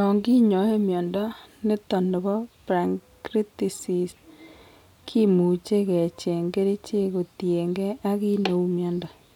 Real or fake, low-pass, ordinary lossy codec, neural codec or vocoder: real; 19.8 kHz; none; none